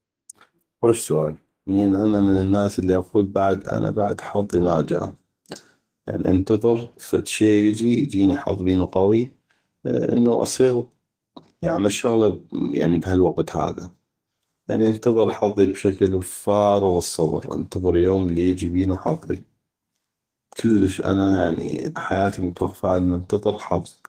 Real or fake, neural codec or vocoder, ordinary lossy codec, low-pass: fake; codec, 32 kHz, 1.9 kbps, SNAC; Opus, 24 kbps; 14.4 kHz